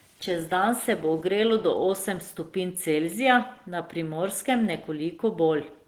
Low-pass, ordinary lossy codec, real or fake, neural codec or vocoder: 19.8 kHz; Opus, 16 kbps; real; none